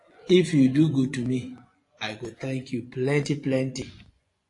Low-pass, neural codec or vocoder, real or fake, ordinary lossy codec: 10.8 kHz; vocoder, 24 kHz, 100 mel bands, Vocos; fake; AAC, 48 kbps